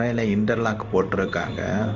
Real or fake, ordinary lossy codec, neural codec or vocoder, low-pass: fake; none; codec, 16 kHz in and 24 kHz out, 1 kbps, XY-Tokenizer; 7.2 kHz